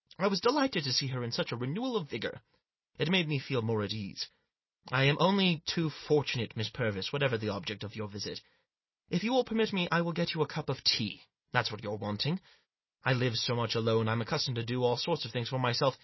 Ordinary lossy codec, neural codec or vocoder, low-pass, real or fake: MP3, 24 kbps; none; 7.2 kHz; real